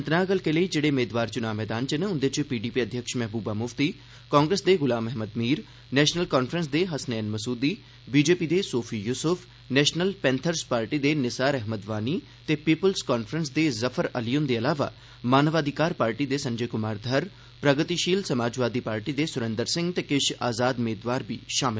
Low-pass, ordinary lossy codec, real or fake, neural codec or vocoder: none; none; real; none